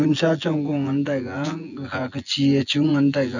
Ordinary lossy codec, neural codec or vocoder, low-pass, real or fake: none; vocoder, 24 kHz, 100 mel bands, Vocos; 7.2 kHz; fake